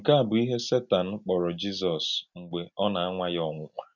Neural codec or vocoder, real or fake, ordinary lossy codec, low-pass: none; real; none; 7.2 kHz